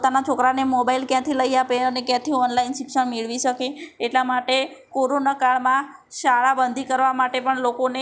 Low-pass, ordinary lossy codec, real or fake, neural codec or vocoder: none; none; real; none